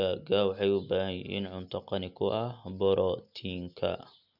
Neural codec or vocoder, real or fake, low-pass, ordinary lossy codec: none; real; 5.4 kHz; none